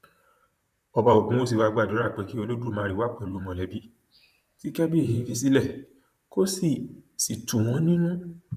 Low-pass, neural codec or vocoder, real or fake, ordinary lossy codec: 14.4 kHz; vocoder, 44.1 kHz, 128 mel bands, Pupu-Vocoder; fake; none